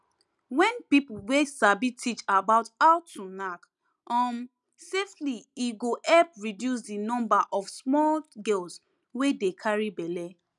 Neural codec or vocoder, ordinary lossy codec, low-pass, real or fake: none; none; none; real